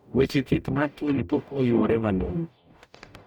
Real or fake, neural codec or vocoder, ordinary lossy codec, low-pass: fake; codec, 44.1 kHz, 0.9 kbps, DAC; none; 19.8 kHz